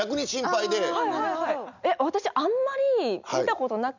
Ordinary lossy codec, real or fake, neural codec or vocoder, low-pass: none; real; none; 7.2 kHz